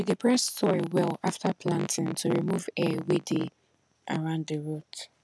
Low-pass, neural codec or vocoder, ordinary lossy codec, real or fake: none; none; none; real